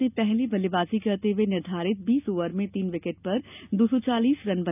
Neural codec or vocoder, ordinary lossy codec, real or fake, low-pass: none; none; real; 3.6 kHz